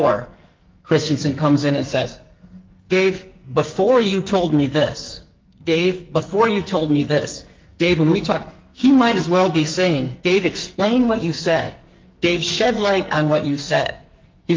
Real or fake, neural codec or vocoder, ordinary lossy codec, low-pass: fake; codec, 44.1 kHz, 2.6 kbps, SNAC; Opus, 32 kbps; 7.2 kHz